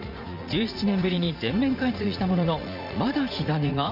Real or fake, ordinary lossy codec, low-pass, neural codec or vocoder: fake; none; 5.4 kHz; vocoder, 44.1 kHz, 80 mel bands, Vocos